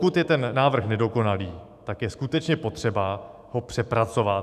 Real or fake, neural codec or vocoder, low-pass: fake; autoencoder, 48 kHz, 128 numbers a frame, DAC-VAE, trained on Japanese speech; 14.4 kHz